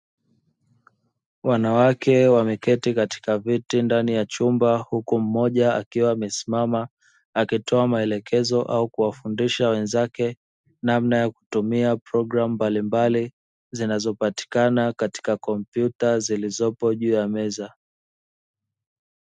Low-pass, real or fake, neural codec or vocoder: 10.8 kHz; real; none